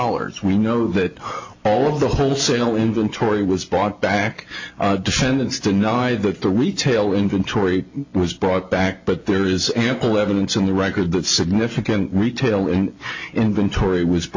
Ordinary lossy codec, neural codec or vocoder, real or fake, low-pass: AAC, 48 kbps; none; real; 7.2 kHz